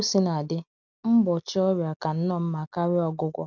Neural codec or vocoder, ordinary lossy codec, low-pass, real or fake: none; none; 7.2 kHz; real